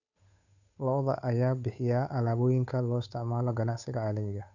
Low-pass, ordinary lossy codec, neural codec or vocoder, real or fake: 7.2 kHz; none; codec, 16 kHz, 8 kbps, FunCodec, trained on Chinese and English, 25 frames a second; fake